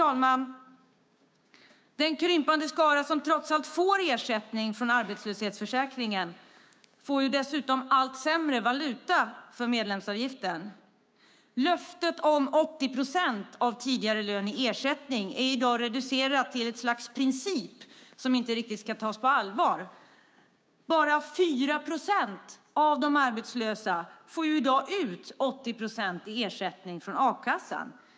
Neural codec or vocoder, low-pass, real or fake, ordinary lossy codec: codec, 16 kHz, 6 kbps, DAC; none; fake; none